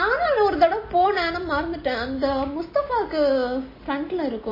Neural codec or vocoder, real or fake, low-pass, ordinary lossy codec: none; real; 5.4 kHz; MP3, 24 kbps